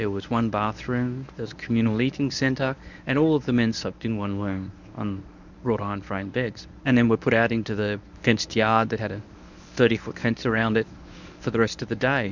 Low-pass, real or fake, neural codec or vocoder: 7.2 kHz; fake; codec, 24 kHz, 0.9 kbps, WavTokenizer, medium speech release version 1